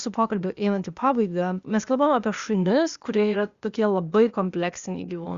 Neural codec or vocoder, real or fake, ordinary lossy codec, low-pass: codec, 16 kHz, 0.8 kbps, ZipCodec; fake; Opus, 64 kbps; 7.2 kHz